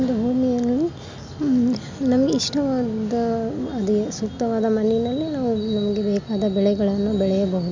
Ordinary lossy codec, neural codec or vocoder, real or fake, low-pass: MP3, 64 kbps; none; real; 7.2 kHz